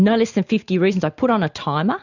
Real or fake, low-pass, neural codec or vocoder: real; 7.2 kHz; none